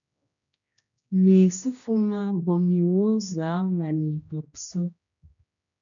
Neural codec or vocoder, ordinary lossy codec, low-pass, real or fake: codec, 16 kHz, 1 kbps, X-Codec, HuBERT features, trained on general audio; MP3, 64 kbps; 7.2 kHz; fake